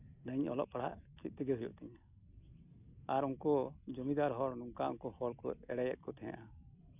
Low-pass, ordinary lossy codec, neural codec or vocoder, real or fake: 3.6 kHz; none; none; real